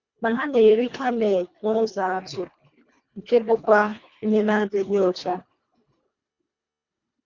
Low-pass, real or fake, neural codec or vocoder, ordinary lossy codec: 7.2 kHz; fake; codec, 24 kHz, 1.5 kbps, HILCodec; Opus, 64 kbps